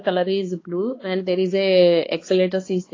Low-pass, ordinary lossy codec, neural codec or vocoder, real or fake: 7.2 kHz; AAC, 32 kbps; codec, 16 kHz, 2 kbps, X-Codec, HuBERT features, trained on balanced general audio; fake